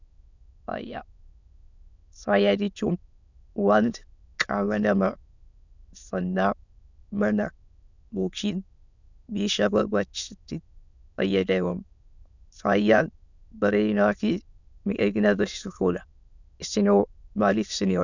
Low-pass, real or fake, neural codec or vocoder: 7.2 kHz; fake; autoencoder, 22.05 kHz, a latent of 192 numbers a frame, VITS, trained on many speakers